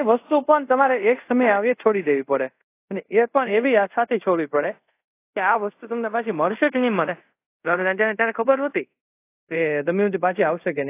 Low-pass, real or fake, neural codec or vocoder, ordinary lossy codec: 3.6 kHz; fake; codec, 24 kHz, 0.5 kbps, DualCodec; AAC, 24 kbps